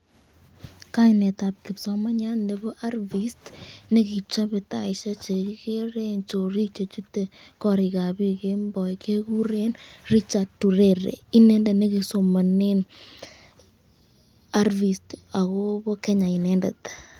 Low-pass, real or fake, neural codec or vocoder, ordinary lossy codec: 19.8 kHz; real; none; Opus, 32 kbps